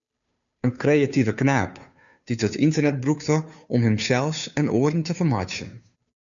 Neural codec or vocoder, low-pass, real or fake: codec, 16 kHz, 2 kbps, FunCodec, trained on Chinese and English, 25 frames a second; 7.2 kHz; fake